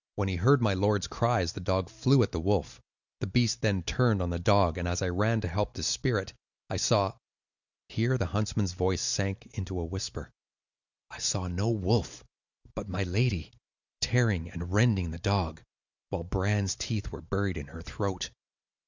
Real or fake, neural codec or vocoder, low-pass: real; none; 7.2 kHz